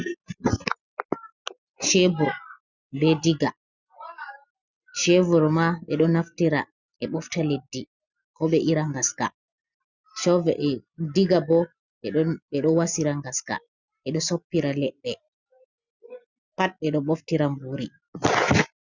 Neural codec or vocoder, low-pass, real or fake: none; 7.2 kHz; real